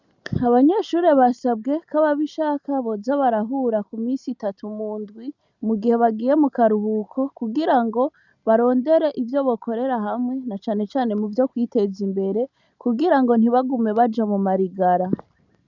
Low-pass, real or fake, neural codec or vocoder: 7.2 kHz; real; none